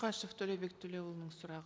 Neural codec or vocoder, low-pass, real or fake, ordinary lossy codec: none; none; real; none